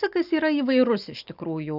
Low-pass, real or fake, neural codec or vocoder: 5.4 kHz; real; none